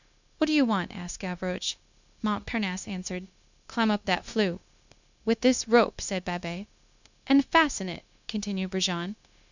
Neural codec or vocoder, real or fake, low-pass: codec, 16 kHz, 0.9 kbps, LongCat-Audio-Codec; fake; 7.2 kHz